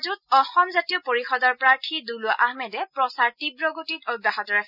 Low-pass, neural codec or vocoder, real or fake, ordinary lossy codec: 5.4 kHz; none; real; none